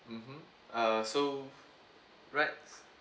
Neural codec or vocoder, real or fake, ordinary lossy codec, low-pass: none; real; none; none